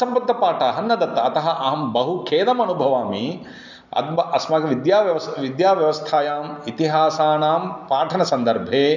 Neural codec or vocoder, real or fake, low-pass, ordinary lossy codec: none; real; 7.2 kHz; none